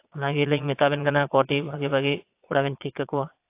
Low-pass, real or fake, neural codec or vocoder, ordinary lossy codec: 3.6 kHz; fake; vocoder, 22.05 kHz, 80 mel bands, Vocos; AAC, 24 kbps